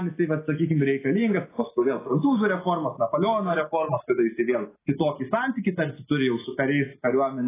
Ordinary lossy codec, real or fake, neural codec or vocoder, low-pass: AAC, 16 kbps; real; none; 3.6 kHz